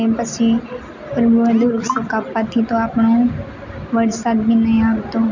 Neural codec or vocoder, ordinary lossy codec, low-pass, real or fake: none; none; 7.2 kHz; real